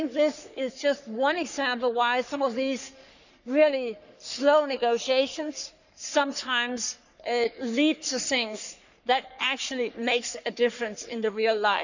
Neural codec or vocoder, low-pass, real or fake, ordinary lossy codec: codec, 44.1 kHz, 3.4 kbps, Pupu-Codec; 7.2 kHz; fake; none